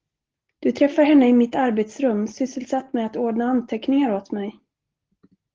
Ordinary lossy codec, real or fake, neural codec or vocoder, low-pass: Opus, 16 kbps; real; none; 7.2 kHz